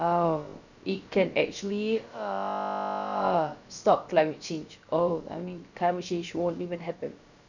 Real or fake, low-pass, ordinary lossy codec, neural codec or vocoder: fake; 7.2 kHz; none; codec, 16 kHz, about 1 kbps, DyCAST, with the encoder's durations